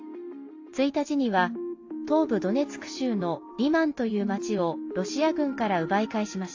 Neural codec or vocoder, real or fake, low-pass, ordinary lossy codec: none; real; 7.2 kHz; AAC, 48 kbps